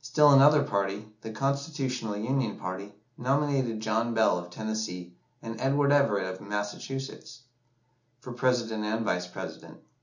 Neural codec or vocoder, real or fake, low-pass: none; real; 7.2 kHz